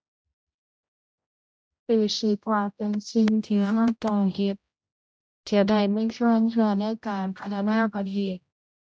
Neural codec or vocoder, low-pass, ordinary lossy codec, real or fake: codec, 16 kHz, 0.5 kbps, X-Codec, HuBERT features, trained on general audio; none; none; fake